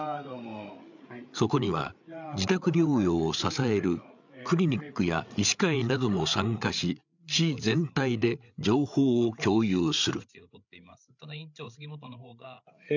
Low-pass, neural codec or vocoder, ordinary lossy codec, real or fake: 7.2 kHz; codec, 16 kHz, 8 kbps, FreqCodec, larger model; none; fake